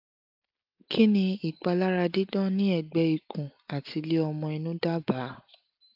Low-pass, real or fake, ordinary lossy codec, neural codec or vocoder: 5.4 kHz; real; AAC, 48 kbps; none